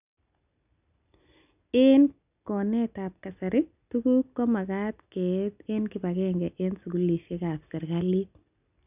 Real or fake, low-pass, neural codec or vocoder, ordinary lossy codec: real; 3.6 kHz; none; none